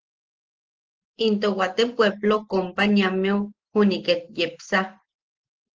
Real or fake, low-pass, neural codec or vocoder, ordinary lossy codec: real; 7.2 kHz; none; Opus, 16 kbps